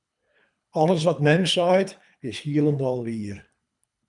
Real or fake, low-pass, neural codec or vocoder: fake; 10.8 kHz; codec, 24 kHz, 3 kbps, HILCodec